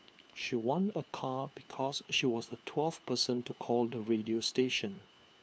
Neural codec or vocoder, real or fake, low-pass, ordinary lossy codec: codec, 16 kHz, 4 kbps, FunCodec, trained on LibriTTS, 50 frames a second; fake; none; none